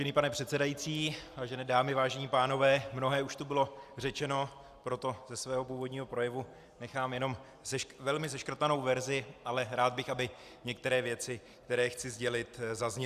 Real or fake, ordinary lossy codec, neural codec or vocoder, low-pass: real; Opus, 64 kbps; none; 14.4 kHz